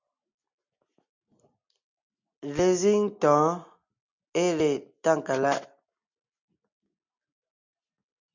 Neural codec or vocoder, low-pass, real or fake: none; 7.2 kHz; real